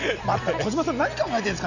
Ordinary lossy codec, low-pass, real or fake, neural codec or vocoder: none; 7.2 kHz; real; none